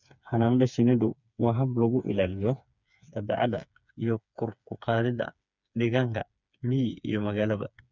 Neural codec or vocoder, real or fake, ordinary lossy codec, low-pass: codec, 16 kHz, 4 kbps, FreqCodec, smaller model; fake; none; 7.2 kHz